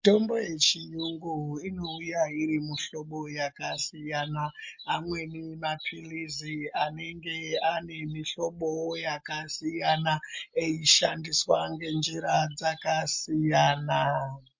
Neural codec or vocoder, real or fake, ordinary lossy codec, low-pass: none; real; MP3, 48 kbps; 7.2 kHz